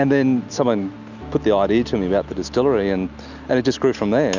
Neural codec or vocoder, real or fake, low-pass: none; real; 7.2 kHz